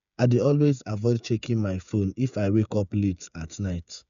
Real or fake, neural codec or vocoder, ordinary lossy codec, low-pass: fake; codec, 16 kHz, 8 kbps, FreqCodec, smaller model; none; 7.2 kHz